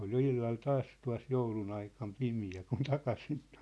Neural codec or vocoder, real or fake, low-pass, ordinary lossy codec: none; real; none; none